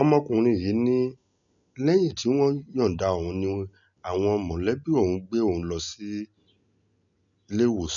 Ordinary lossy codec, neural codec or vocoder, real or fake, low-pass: none; none; real; 7.2 kHz